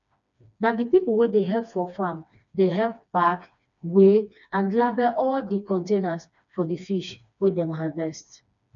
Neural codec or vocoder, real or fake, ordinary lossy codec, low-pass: codec, 16 kHz, 2 kbps, FreqCodec, smaller model; fake; none; 7.2 kHz